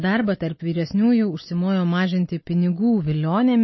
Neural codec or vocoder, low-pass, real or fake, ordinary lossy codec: none; 7.2 kHz; real; MP3, 24 kbps